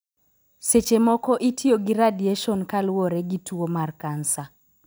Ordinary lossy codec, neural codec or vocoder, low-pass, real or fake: none; none; none; real